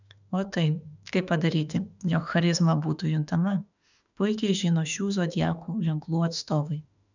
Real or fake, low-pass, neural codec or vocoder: fake; 7.2 kHz; autoencoder, 48 kHz, 32 numbers a frame, DAC-VAE, trained on Japanese speech